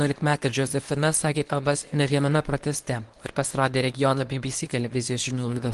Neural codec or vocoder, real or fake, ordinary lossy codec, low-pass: codec, 24 kHz, 0.9 kbps, WavTokenizer, medium speech release version 1; fake; Opus, 24 kbps; 10.8 kHz